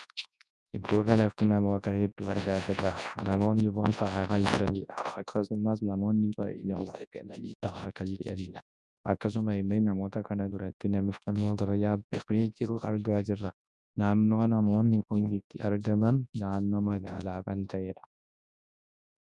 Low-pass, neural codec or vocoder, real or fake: 10.8 kHz; codec, 24 kHz, 0.9 kbps, WavTokenizer, large speech release; fake